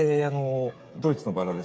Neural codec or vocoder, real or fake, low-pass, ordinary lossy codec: codec, 16 kHz, 8 kbps, FreqCodec, smaller model; fake; none; none